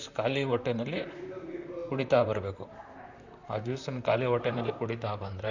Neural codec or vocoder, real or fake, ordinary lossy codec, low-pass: vocoder, 44.1 kHz, 128 mel bands, Pupu-Vocoder; fake; none; 7.2 kHz